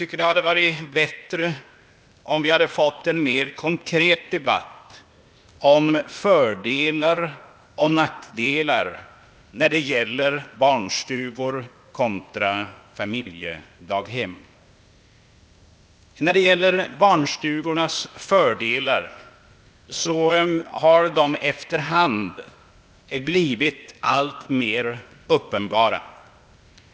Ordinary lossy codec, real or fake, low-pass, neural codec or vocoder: none; fake; none; codec, 16 kHz, 0.8 kbps, ZipCodec